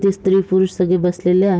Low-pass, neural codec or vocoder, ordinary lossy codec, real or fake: none; none; none; real